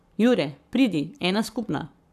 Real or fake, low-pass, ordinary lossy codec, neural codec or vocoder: fake; 14.4 kHz; none; codec, 44.1 kHz, 7.8 kbps, Pupu-Codec